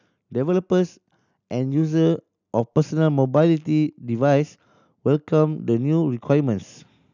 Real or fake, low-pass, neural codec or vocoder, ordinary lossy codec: real; 7.2 kHz; none; none